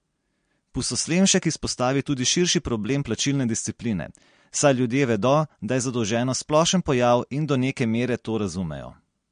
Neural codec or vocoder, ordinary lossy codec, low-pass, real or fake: none; MP3, 48 kbps; 9.9 kHz; real